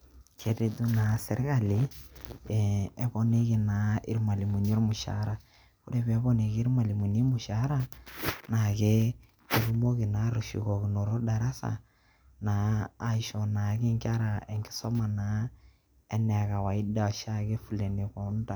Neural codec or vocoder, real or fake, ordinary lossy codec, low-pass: none; real; none; none